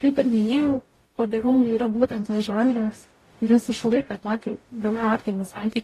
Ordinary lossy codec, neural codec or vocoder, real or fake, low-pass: AAC, 48 kbps; codec, 44.1 kHz, 0.9 kbps, DAC; fake; 14.4 kHz